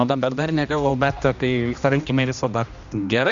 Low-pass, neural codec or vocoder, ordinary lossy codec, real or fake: 7.2 kHz; codec, 16 kHz, 1 kbps, X-Codec, HuBERT features, trained on general audio; Opus, 64 kbps; fake